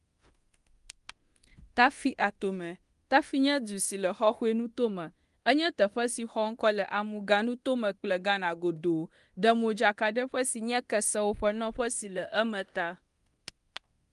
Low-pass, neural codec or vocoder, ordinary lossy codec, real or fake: 10.8 kHz; codec, 24 kHz, 0.9 kbps, DualCodec; Opus, 24 kbps; fake